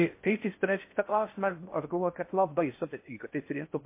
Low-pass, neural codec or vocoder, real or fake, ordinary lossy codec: 3.6 kHz; codec, 16 kHz in and 24 kHz out, 0.6 kbps, FocalCodec, streaming, 4096 codes; fake; MP3, 24 kbps